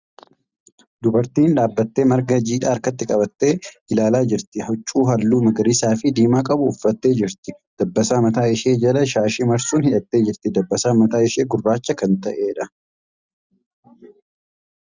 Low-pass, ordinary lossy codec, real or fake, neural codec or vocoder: 7.2 kHz; Opus, 64 kbps; real; none